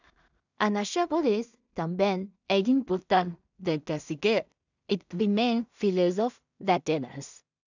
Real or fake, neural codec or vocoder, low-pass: fake; codec, 16 kHz in and 24 kHz out, 0.4 kbps, LongCat-Audio-Codec, two codebook decoder; 7.2 kHz